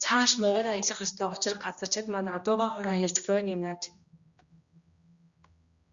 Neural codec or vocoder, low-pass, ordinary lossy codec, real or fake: codec, 16 kHz, 1 kbps, X-Codec, HuBERT features, trained on general audio; 7.2 kHz; Opus, 64 kbps; fake